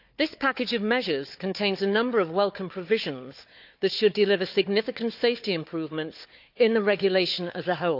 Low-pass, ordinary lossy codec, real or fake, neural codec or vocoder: 5.4 kHz; none; fake; codec, 16 kHz, 4 kbps, FunCodec, trained on Chinese and English, 50 frames a second